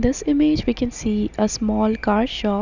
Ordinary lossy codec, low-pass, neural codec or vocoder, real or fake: none; 7.2 kHz; none; real